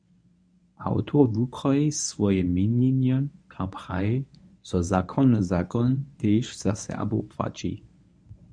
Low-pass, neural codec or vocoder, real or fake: 9.9 kHz; codec, 24 kHz, 0.9 kbps, WavTokenizer, medium speech release version 1; fake